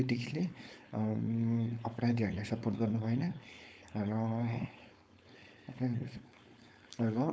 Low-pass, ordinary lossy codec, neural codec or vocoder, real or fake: none; none; codec, 16 kHz, 4.8 kbps, FACodec; fake